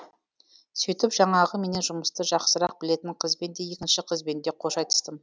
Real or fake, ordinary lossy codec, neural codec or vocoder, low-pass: real; none; none; none